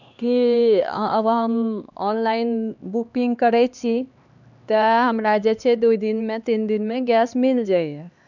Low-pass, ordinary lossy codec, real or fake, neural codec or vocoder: 7.2 kHz; none; fake; codec, 16 kHz, 2 kbps, X-Codec, HuBERT features, trained on LibriSpeech